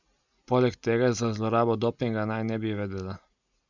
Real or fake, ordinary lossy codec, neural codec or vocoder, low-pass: real; none; none; 7.2 kHz